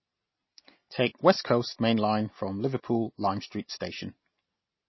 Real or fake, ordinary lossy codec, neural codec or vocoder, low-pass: real; MP3, 24 kbps; none; 7.2 kHz